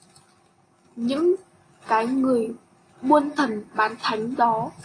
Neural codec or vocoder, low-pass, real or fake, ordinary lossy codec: none; 9.9 kHz; real; AAC, 32 kbps